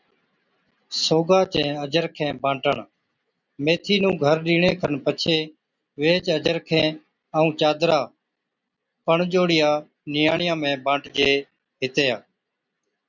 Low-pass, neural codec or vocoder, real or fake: 7.2 kHz; none; real